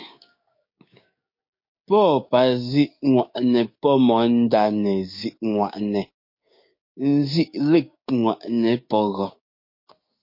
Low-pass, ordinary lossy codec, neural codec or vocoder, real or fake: 5.4 kHz; MP3, 32 kbps; codec, 16 kHz, 6 kbps, DAC; fake